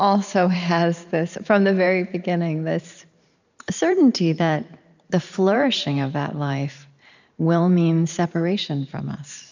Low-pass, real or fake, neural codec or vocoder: 7.2 kHz; fake; vocoder, 44.1 kHz, 128 mel bands every 512 samples, BigVGAN v2